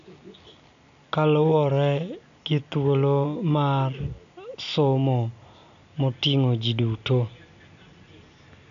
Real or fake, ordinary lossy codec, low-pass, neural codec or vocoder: real; none; 7.2 kHz; none